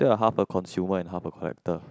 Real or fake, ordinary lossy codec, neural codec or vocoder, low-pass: real; none; none; none